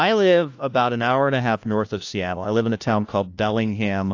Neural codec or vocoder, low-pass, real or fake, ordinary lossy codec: codec, 16 kHz, 1 kbps, FunCodec, trained on LibriTTS, 50 frames a second; 7.2 kHz; fake; AAC, 48 kbps